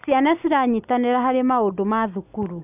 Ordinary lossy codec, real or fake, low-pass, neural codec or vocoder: none; real; 3.6 kHz; none